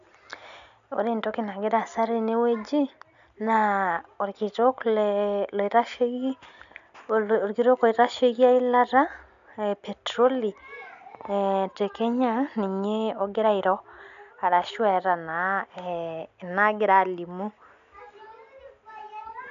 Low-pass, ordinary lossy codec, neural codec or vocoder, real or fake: 7.2 kHz; none; none; real